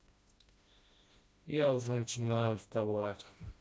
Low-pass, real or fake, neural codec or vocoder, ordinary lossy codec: none; fake; codec, 16 kHz, 1 kbps, FreqCodec, smaller model; none